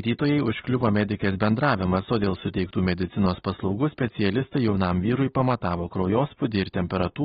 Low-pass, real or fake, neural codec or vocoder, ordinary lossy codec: 10.8 kHz; real; none; AAC, 16 kbps